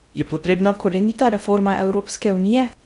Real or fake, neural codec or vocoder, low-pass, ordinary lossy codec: fake; codec, 16 kHz in and 24 kHz out, 0.6 kbps, FocalCodec, streaming, 4096 codes; 10.8 kHz; none